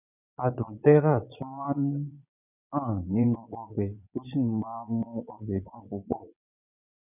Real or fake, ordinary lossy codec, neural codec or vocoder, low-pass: fake; none; vocoder, 22.05 kHz, 80 mel bands, Vocos; 3.6 kHz